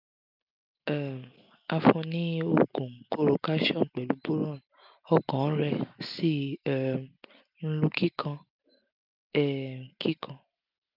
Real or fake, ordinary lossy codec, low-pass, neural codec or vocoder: real; none; 5.4 kHz; none